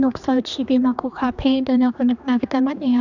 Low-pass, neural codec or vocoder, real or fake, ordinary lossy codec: 7.2 kHz; codec, 16 kHz, 2 kbps, X-Codec, HuBERT features, trained on general audio; fake; none